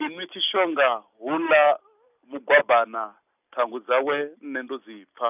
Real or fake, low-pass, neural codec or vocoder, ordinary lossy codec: real; 3.6 kHz; none; none